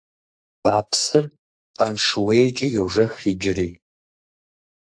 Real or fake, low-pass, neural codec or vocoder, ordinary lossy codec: fake; 9.9 kHz; codec, 44.1 kHz, 2.6 kbps, SNAC; AAC, 64 kbps